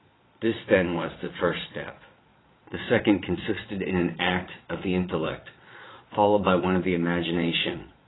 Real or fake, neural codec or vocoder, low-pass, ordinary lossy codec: fake; vocoder, 22.05 kHz, 80 mel bands, Vocos; 7.2 kHz; AAC, 16 kbps